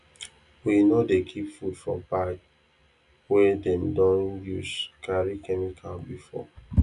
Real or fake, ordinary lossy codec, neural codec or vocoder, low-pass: real; none; none; 10.8 kHz